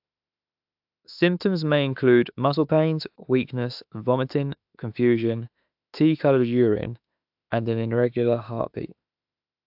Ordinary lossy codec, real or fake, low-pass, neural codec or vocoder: AAC, 48 kbps; fake; 5.4 kHz; autoencoder, 48 kHz, 32 numbers a frame, DAC-VAE, trained on Japanese speech